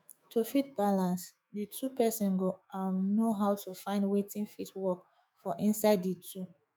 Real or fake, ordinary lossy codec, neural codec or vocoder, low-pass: fake; none; autoencoder, 48 kHz, 128 numbers a frame, DAC-VAE, trained on Japanese speech; none